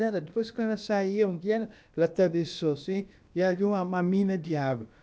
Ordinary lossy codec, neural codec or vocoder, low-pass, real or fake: none; codec, 16 kHz, about 1 kbps, DyCAST, with the encoder's durations; none; fake